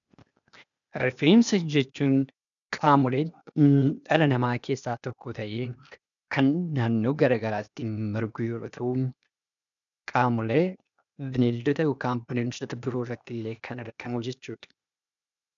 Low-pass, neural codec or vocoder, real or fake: 7.2 kHz; codec, 16 kHz, 0.8 kbps, ZipCodec; fake